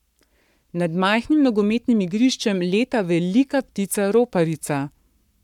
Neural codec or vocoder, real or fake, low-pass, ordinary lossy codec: codec, 44.1 kHz, 7.8 kbps, Pupu-Codec; fake; 19.8 kHz; none